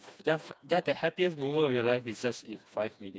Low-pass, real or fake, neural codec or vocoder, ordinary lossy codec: none; fake; codec, 16 kHz, 2 kbps, FreqCodec, smaller model; none